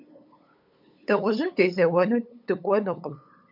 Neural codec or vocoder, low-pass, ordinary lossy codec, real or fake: codec, 16 kHz, 8 kbps, FunCodec, trained on LibriTTS, 25 frames a second; 5.4 kHz; MP3, 48 kbps; fake